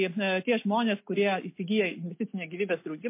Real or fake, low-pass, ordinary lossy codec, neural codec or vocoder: real; 3.6 kHz; MP3, 24 kbps; none